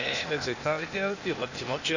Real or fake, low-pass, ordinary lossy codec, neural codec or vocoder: fake; 7.2 kHz; none; codec, 16 kHz, 0.8 kbps, ZipCodec